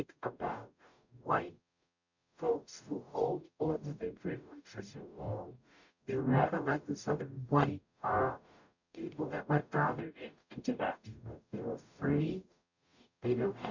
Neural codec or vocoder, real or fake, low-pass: codec, 44.1 kHz, 0.9 kbps, DAC; fake; 7.2 kHz